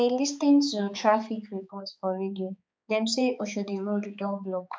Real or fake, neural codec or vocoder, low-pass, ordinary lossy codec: fake; codec, 16 kHz, 4 kbps, X-Codec, HuBERT features, trained on balanced general audio; none; none